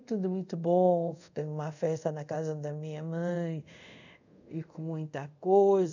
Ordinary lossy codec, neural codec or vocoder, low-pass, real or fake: none; codec, 24 kHz, 0.5 kbps, DualCodec; 7.2 kHz; fake